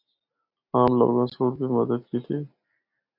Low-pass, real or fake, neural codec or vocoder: 5.4 kHz; real; none